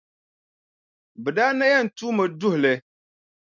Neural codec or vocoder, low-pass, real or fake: none; 7.2 kHz; real